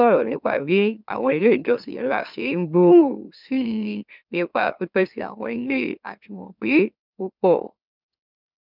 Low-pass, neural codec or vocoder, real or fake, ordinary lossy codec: 5.4 kHz; autoencoder, 44.1 kHz, a latent of 192 numbers a frame, MeloTTS; fake; none